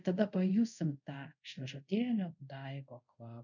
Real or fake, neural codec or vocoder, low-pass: fake; codec, 24 kHz, 0.5 kbps, DualCodec; 7.2 kHz